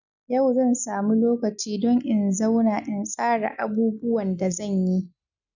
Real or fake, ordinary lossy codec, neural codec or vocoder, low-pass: real; none; none; 7.2 kHz